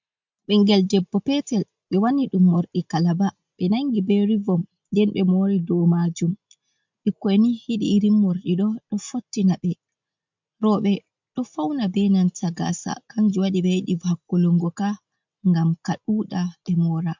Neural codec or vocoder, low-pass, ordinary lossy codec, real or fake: none; 7.2 kHz; MP3, 64 kbps; real